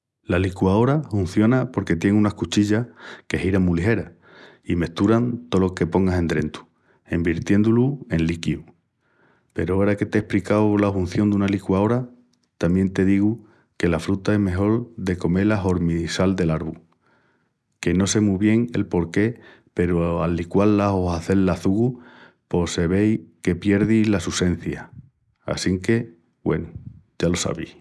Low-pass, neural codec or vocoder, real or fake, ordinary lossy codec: none; none; real; none